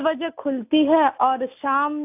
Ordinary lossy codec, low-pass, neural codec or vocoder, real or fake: AAC, 32 kbps; 3.6 kHz; none; real